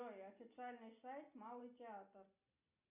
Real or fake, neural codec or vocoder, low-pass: real; none; 3.6 kHz